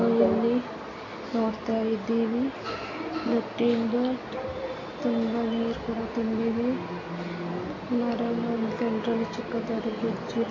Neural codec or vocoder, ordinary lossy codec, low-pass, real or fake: none; AAC, 32 kbps; 7.2 kHz; real